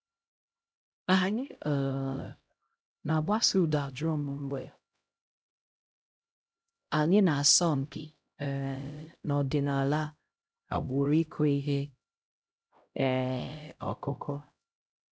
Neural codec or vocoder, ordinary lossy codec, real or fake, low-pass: codec, 16 kHz, 0.5 kbps, X-Codec, HuBERT features, trained on LibriSpeech; none; fake; none